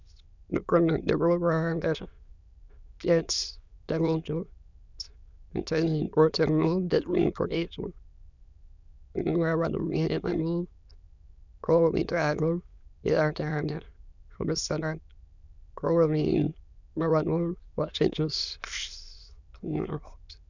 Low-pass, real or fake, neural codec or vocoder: 7.2 kHz; fake; autoencoder, 22.05 kHz, a latent of 192 numbers a frame, VITS, trained on many speakers